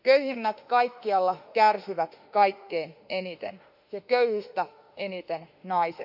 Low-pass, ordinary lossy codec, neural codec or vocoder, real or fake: 5.4 kHz; none; autoencoder, 48 kHz, 32 numbers a frame, DAC-VAE, trained on Japanese speech; fake